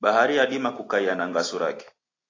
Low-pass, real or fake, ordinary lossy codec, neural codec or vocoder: 7.2 kHz; real; AAC, 32 kbps; none